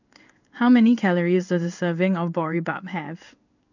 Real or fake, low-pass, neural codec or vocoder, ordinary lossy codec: fake; 7.2 kHz; codec, 16 kHz in and 24 kHz out, 1 kbps, XY-Tokenizer; none